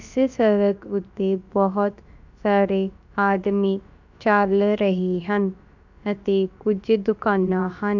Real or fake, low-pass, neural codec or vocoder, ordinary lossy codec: fake; 7.2 kHz; codec, 16 kHz, about 1 kbps, DyCAST, with the encoder's durations; none